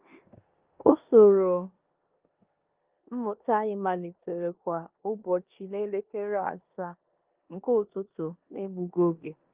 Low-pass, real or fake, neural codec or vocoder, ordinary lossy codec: 3.6 kHz; fake; codec, 16 kHz in and 24 kHz out, 0.9 kbps, LongCat-Audio-Codec, four codebook decoder; Opus, 32 kbps